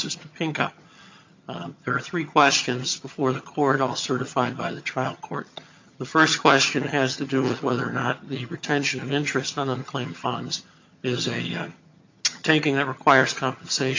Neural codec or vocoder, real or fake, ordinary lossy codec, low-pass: vocoder, 22.05 kHz, 80 mel bands, HiFi-GAN; fake; MP3, 64 kbps; 7.2 kHz